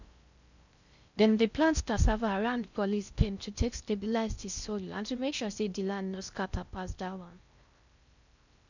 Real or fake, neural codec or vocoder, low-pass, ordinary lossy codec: fake; codec, 16 kHz in and 24 kHz out, 0.6 kbps, FocalCodec, streaming, 4096 codes; 7.2 kHz; none